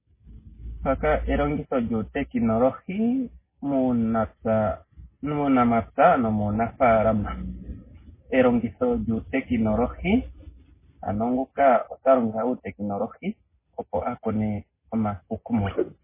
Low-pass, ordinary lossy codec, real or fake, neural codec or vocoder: 3.6 kHz; MP3, 16 kbps; real; none